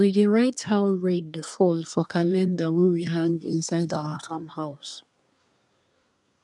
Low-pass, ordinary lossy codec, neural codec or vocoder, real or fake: 10.8 kHz; none; codec, 24 kHz, 1 kbps, SNAC; fake